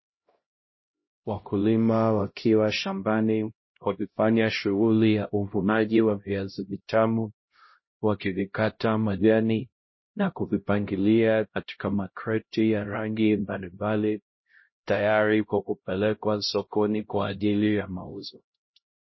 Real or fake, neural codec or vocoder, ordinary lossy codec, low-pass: fake; codec, 16 kHz, 0.5 kbps, X-Codec, HuBERT features, trained on LibriSpeech; MP3, 24 kbps; 7.2 kHz